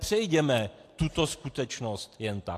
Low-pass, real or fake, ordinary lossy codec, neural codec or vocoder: 14.4 kHz; real; AAC, 64 kbps; none